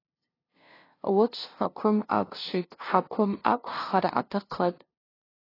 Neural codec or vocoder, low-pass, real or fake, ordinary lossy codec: codec, 16 kHz, 0.5 kbps, FunCodec, trained on LibriTTS, 25 frames a second; 5.4 kHz; fake; AAC, 24 kbps